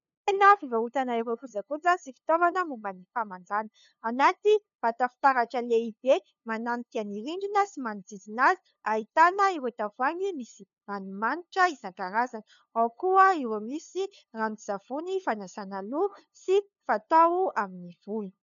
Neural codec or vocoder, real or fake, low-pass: codec, 16 kHz, 2 kbps, FunCodec, trained on LibriTTS, 25 frames a second; fake; 7.2 kHz